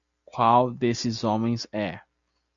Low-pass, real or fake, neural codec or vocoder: 7.2 kHz; real; none